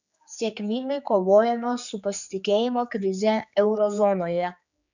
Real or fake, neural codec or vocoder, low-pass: fake; codec, 16 kHz, 4 kbps, X-Codec, HuBERT features, trained on general audio; 7.2 kHz